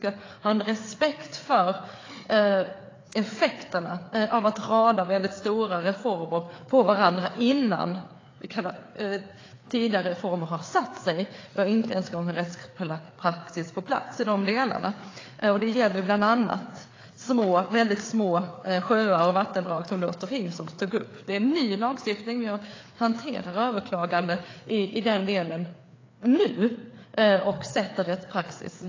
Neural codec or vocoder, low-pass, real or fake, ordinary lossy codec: codec, 16 kHz, 4 kbps, FreqCodec, larger model; 7.2 kHz; fake; AAC, 32 kbps